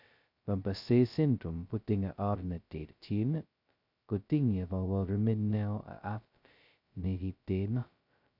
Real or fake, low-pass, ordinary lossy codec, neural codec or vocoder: fake; 5.4 kHz; none; codec, 16 kHz, 0.2 kbps, FocalCodec